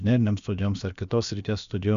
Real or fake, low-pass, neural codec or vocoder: fake; 7.2 kHz; codec, 16 kHz, about 1 kbps, DyCAST, with the encoder's durations